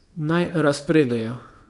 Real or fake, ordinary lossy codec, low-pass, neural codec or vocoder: fake; none; 10.8 kHz; codec, 24 kHz, 0.9 kbps, WavTokenizer, small release